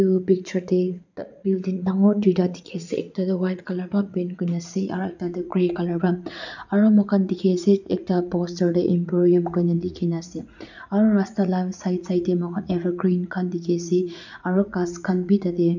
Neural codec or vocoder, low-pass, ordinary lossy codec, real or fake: vocoder, 44.1 kHz, 80 mel bands, Vocos; 7.2 kHz; none; fake